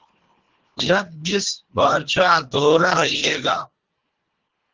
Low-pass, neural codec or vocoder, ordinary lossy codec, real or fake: 7.2 kHz; codec, 24 kHz, 1.5 kbps, HILCodec; Opus, 16 kbps; fake